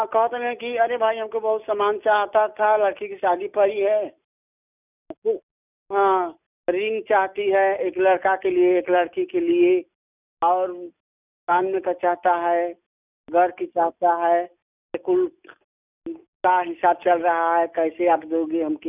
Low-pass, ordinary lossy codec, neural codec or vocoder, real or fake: 3.6 kHz; none; none; real